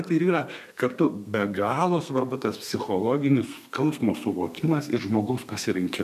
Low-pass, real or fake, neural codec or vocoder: 14.4 kHz; fake; codec, 32 kHz, 1.9 kbps, SNAC